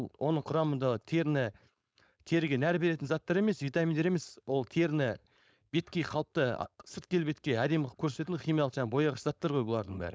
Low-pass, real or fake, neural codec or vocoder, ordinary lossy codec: none; fake; codec, 16 kHz, 4.8 kbps, FACodec; none